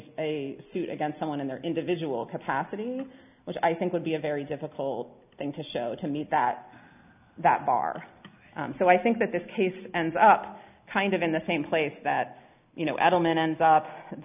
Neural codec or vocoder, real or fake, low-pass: none; real; 3.6 kHz